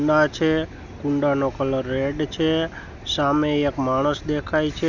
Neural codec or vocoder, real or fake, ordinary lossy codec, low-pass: none; real; none; 7.2 kHz